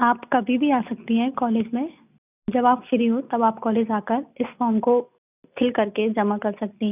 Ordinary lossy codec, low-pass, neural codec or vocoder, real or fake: none; 3.6 kHz; none; real